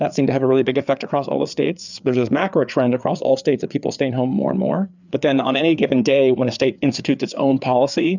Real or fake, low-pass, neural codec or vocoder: fake; 7.2 kHz; codec, 16 kHz, 4 kbps, FreqCodec, larger model